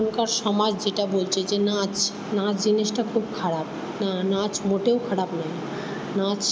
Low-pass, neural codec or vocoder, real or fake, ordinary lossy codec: none; none; real; none